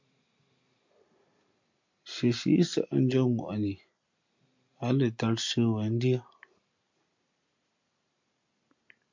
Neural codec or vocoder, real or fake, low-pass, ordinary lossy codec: none; real; 7.2 kHz; MP3, 48 kbps